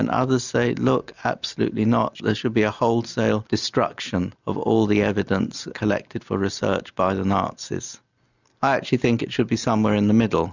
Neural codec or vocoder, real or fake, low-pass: none; real; 7.2 kHz